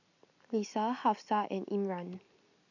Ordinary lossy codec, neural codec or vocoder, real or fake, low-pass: none; none; real; 7.2 kHz